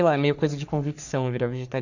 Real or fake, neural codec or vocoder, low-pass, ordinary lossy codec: fake; codec, 44.1 kHz, 3.4 kbps, Pupu-Codec; 7.2 kHz; none